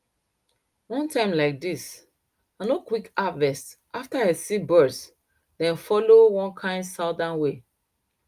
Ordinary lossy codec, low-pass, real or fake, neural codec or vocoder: Opus, 32 kbps; 14.4 kHz; real; none